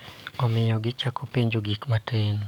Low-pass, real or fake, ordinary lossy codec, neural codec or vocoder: 19.8 kHz; fake; none; codec, 44.1 kHz, 7.8 kbps, DAC